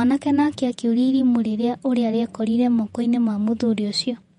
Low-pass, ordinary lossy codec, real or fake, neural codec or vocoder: 19.8 kHz; MP3, 48 kbps; fake; vocoder, 48 kHz, 128 mel bands, Vocos